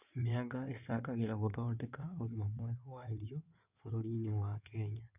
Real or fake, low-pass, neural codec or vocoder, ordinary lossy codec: fake; 3.6 kHz; codec, 16 kHz in and 24 kHz out, 2.2 kbps, FireRedTTS-2 codec; none